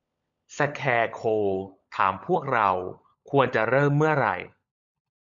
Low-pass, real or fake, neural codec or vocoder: 7.2 kHz; fake; codec, 16 kHz, 16 kbps, FunCodec, trained on LibriTTS, 50 frames a second